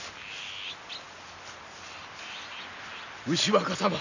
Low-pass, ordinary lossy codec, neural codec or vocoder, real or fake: 7.2 kHz; none; none; real